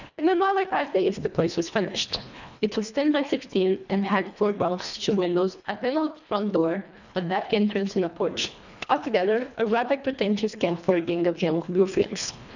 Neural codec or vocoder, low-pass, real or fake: codec, 24 kHz, 1.5 kbps, HILCodec; 7.2 kHz; fake